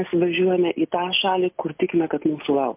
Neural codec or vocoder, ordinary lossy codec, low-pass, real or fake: none; MP3, 32 kbps; 3.6 kHz; real